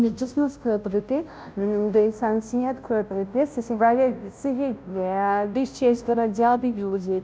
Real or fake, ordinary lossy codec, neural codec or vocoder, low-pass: fake; none; codec, 16 kHz, 0.5 kbps, FunCodec, trained on Chinese and English, 25 frames a second; none